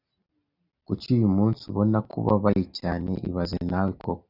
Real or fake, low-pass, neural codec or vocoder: real; 5.4 kHz; none